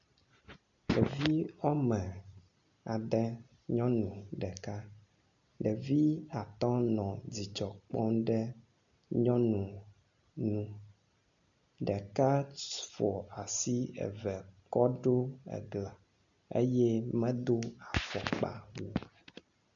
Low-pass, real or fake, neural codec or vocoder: 7.2 kHz; real; none